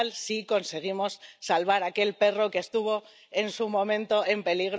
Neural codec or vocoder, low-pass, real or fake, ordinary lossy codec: none; none; real; none